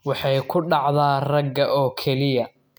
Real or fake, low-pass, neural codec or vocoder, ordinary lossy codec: real; none; none; none